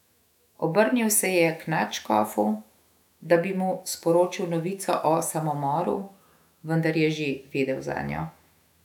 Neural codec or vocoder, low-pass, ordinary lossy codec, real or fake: autoencoder, 48 kHz, 128 numbers a frame, DAC-VAE, trained on Japanese speech; 19.8 kHz; none; fake